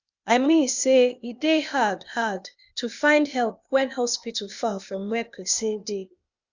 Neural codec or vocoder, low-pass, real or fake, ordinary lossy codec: codec, 16 kHz, 0.8 kbps, ZipCodec; 7.2 kHz; fake; Opus, 64 kbps